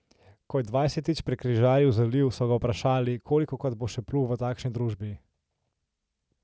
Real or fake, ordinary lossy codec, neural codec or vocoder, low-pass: real; none; none; none